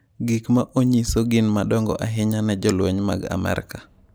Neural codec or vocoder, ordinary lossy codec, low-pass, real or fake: none; none; none; real